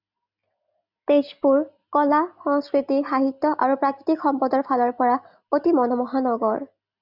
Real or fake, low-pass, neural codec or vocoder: real; 5.4 kHz; none